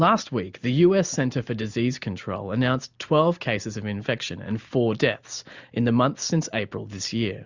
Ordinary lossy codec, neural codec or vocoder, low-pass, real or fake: Opus, 64 kbps; none; 7.2 kHz; real